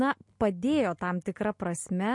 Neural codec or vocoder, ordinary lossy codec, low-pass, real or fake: none; MP3, 48 kbps; 10.8 kHz; real